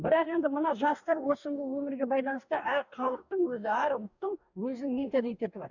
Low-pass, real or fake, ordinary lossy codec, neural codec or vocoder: 7.2 kHz; fake; none; codec, 44.1 kHz, 2.6 kbps, DAC